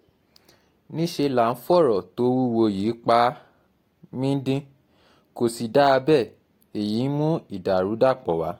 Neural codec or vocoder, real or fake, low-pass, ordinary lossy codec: none; real; 19.8 kHz; AAC, 48 kbps